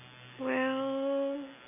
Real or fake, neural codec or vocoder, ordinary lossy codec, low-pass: real; none; none; 3.6 kHz